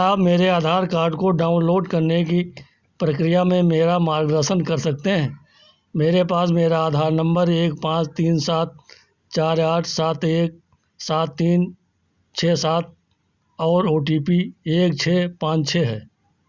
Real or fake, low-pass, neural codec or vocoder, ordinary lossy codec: real; 7.2 kHz; none; Opus, 64 kbps